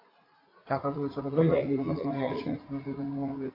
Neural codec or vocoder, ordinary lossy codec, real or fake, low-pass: vocoder, 44.1 kHz, 80 mel bands, Vocos; AAC, 24 kbps; fake; 5.4 kHz